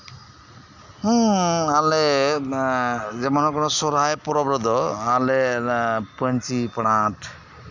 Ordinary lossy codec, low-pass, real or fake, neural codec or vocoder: Opus, 64 kbps; 7.2 kHz; real; none